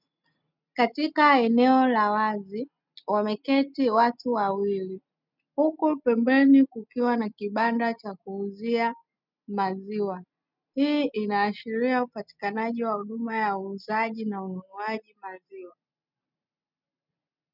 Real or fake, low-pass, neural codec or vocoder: real; 5.4 kHz; none